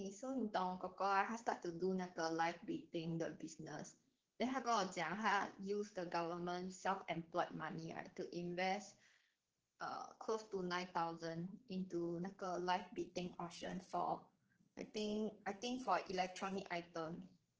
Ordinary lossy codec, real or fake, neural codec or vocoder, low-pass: Opus, 16 kbps; fake; codec, 16 kHz, 4 kbps, X-Codec, HuBERT features, trained on general audio; 7.2 kHz